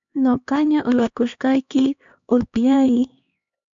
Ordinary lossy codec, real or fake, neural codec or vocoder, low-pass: MP3, 64 kbps; fake; codec, 16 kHz, 2 kbps, X-Codec, HuBERT features, trained on LibriSpeech; 7.2 kHz